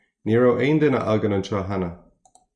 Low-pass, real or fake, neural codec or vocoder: 10.8 kHz; real; none